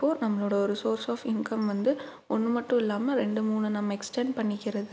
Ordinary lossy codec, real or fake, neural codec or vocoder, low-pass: none; real; none; none